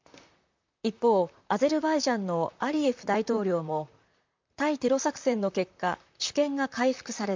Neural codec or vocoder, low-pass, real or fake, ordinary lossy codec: vocoder, 44.1 kHz, 128 mel bands, Pupu-Vocoder; 7.2 kHz; fake; MP3, 64 kbps